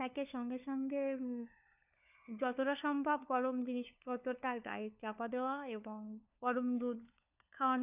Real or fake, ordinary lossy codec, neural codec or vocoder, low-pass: fake; none; codec, 16 kHz, 4 kbps, FunCodec, trained on LibriTTS, 50 frames a second; 3.6 kHz